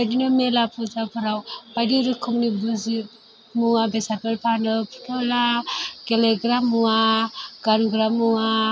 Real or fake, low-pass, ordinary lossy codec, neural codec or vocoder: real; none; none; none